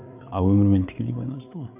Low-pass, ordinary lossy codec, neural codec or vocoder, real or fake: 3.6 kHz; AAC, 32 kbps; codec, 16 kHz, 8 kbps, FreqCodec, larger model; fake